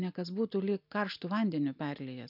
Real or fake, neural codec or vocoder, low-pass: real; none; 5.4 kHz